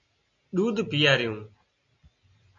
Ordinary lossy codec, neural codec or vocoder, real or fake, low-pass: AAC, 64 kbps; none; real; 7.2 kHz